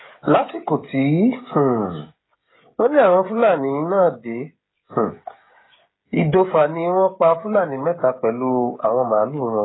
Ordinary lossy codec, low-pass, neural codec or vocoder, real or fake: AAC, 16 kbps; 7.2 kHz; codec, 16 kHz, 16 kbps, FreqCodec, smaller model; fake